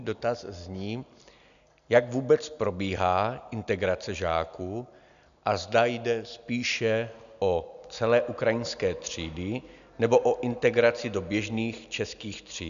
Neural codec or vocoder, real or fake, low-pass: none; real; 7.2 kHz